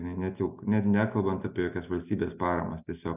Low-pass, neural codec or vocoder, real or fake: 3.6 kHz; none; real